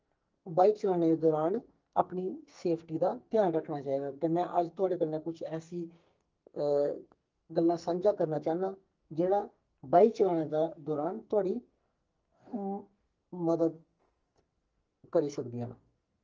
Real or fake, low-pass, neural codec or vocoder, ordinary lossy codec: fake; 7.2 kHz; codec, 44.1 kHz, 2.6 kbps, SNAC; Opus, 24 kbps